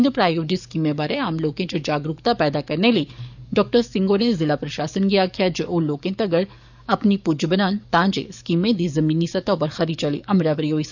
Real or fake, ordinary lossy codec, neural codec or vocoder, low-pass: fake; none; codec, 44.1 kHz, 7.8 kbps, DAC; 7.2 kHz